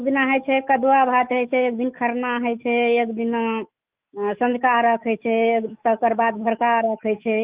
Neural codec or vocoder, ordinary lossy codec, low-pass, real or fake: autoencoder, 48 kHz, 128 numbers a frame, DAC-VAE, trained on Japanese speech; Opus, 24 kbps; 3.6 kHz; fake